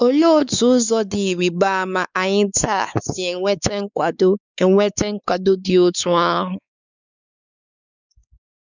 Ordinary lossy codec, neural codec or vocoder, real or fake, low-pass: none; codec, 16 kHz, 4 kbps, X-Codec, WavLM features, trained on Multilingual LibriSpeech; fake; 7.2 kHz